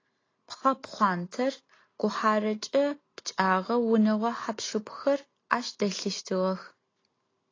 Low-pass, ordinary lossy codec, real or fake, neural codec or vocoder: 7.2 kHz; AAC, 32 kbps; real; none